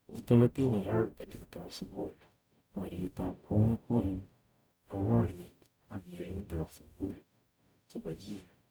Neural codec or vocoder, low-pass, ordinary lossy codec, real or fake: codec, 44.1 kHz, 0.9 kbps, DAC; none; none; fake